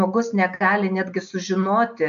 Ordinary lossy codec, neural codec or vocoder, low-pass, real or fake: AAC, 64 kbps; none; 7.2 kHz; real